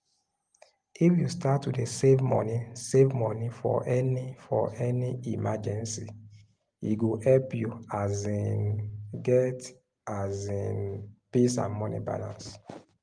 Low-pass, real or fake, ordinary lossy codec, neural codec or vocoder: 9.9 kHz; real; Opus, 32 kbps; none